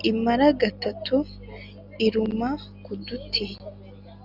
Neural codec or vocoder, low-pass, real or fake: none; 5.4 kHz; real